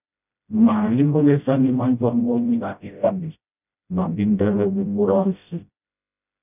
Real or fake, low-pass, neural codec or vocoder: fake; 3.6 kHz; codec, 16 kHz, 0.5 kbps, FreqCodec, smaller model